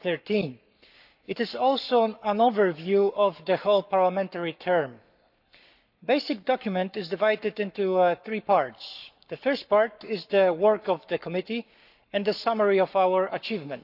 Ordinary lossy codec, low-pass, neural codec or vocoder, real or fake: none; 5.4 kHz; codec, 44.1 kHz, 7.8 kbps, Pupu-Codec; fake